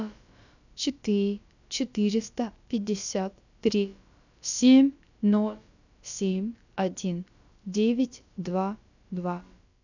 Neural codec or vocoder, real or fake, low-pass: codec, 16 kHz, about 1 kbps, DyCAST, with the encoder's durations; fake; 7.2 kHz